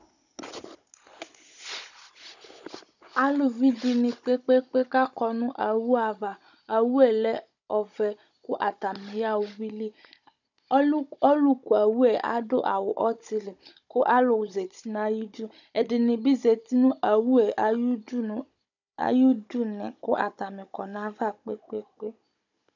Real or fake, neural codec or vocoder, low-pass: fake; codec, 16 kHz, 16 kbps, FunCodec, trained on Chinese and English, 50 frames a second; 7.2 kHz